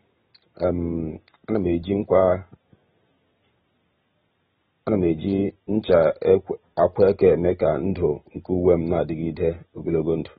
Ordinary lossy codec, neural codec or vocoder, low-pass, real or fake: AAC, 16 kbps; none; 19.8 kHz; real